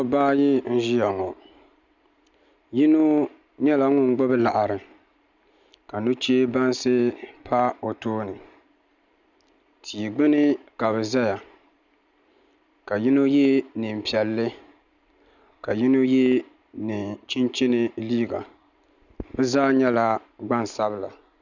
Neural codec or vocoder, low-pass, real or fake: none; 7.2 kHz; real